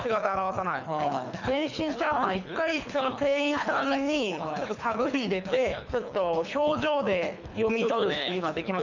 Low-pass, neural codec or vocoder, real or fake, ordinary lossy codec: 7.2 kHz; codec, 24 kHz, 3 kbps, HILCodec; fake; none